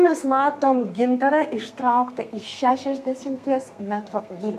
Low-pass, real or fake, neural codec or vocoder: 14.4 kHz; fake; codec, 32 kHz, 1.9 kbps, SNAC